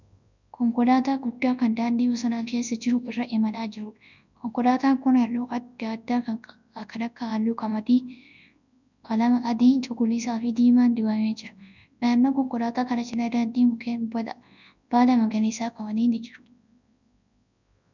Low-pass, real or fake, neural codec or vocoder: 7.2 kHz; fake; codec, 24 kHz, 0.9 kbps, WavTokenizer, large speech release